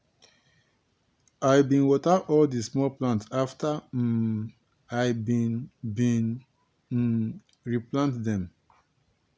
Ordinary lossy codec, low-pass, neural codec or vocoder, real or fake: none; none; none; real